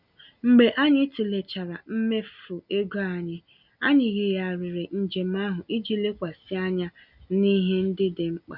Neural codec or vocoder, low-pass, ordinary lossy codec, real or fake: none; 5.4 kHz; none; real